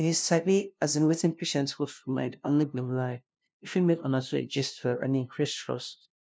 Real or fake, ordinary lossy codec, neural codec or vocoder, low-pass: fake; none; codec, 16 kHz, 0.5 kbps, FunCodec, trained on LibriTTS, 25 frames a second; none